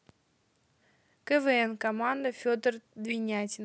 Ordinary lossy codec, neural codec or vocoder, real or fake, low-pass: none; none; real; none